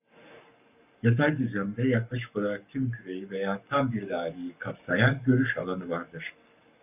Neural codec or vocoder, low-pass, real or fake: codec, 44.1 kHz, 7.8 kbps, Pupu-Codec; 3.6 kHz; fake